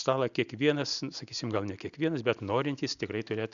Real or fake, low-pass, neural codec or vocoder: real; 7.2 kHz; none